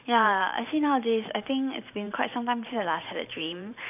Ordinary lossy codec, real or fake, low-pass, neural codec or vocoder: none; fake; 3.6 kHz; vocoder, 44.1 kHz, 128 mel bands every 512 samples, BigVGAN v2